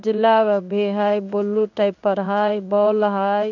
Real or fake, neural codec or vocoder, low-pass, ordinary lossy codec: fake; codec, 16 kHz in and 24 kHz out, 1 kbps, XY-Tokenizer; 7.2 kHz; none